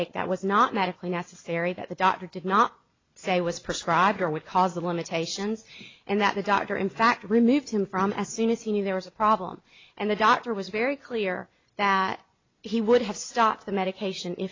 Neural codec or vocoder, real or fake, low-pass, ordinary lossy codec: none; real; 7.2 kHz; AAC, 32 kbps